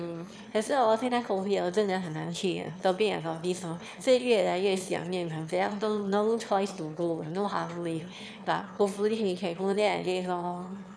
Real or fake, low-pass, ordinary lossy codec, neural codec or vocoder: fake; none; none; autoencoder, 22.05 kHz, a latent of 192 numbers a frame, VITS, trained on one speaker